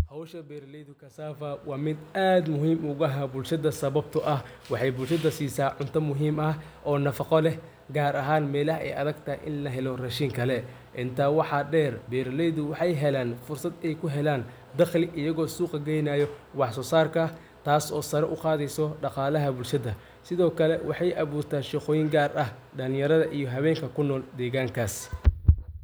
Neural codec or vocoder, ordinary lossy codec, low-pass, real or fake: none; none; none; real